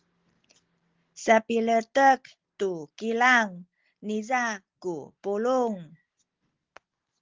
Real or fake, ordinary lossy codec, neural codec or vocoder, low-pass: real; Opus, 16 kbps; none; 7.2 kHz